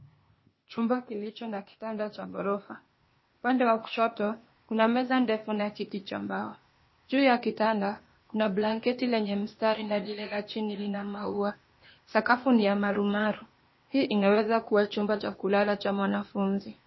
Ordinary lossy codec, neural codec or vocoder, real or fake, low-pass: MP3, 24 kbps; codec, 16 kHz, 0.8 kbps, ZipCodec; fake; 7.2 kHz